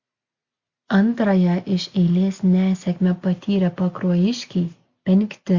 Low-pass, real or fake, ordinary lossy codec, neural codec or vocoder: 7.2 kHz; real; Opus, 64 kbps; none